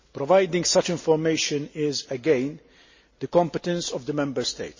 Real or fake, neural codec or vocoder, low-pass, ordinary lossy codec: real; none; 7.2 kHz; MP3, 32 kbps